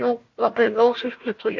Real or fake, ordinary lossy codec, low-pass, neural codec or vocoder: fake; MP3, 48 kbps; 7.2 kHz; codec, 16 kHz, 1 kbps, FunCodec, trained on Chinese and English, 50 frames a second